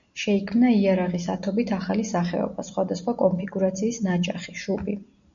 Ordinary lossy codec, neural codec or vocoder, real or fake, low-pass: MP3, 96 kbps; none; real; 7.2 kHz